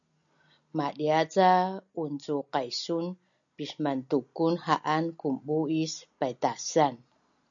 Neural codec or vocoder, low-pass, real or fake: none; 7.2 kHz; real